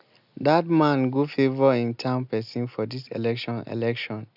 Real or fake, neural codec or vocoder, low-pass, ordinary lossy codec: real; none; 5.4 kHz; none